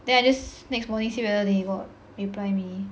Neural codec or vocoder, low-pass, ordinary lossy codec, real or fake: none; none; none; real